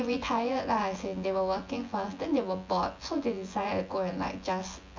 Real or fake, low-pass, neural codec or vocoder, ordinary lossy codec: fake; 7.2 kHz; vocoder, 24 kHz, 100 mel bands, Vocos; MP3, 48 kbps